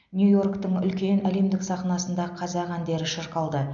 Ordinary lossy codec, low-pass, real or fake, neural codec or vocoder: none; 7.2 kHz; real; none